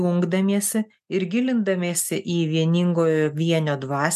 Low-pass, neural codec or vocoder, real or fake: 14.4 kHz; none; real